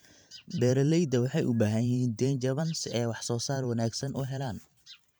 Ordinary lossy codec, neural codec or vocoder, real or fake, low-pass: none; vocoder, 44.1 kHz, 128 mel bands every 512 samples, BigVGAN v2; fake; none